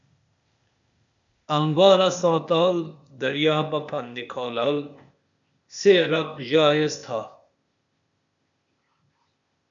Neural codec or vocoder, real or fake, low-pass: codec, 16 kHz, 0.8 kbps, ZipCodec; fake; 7.2 kHz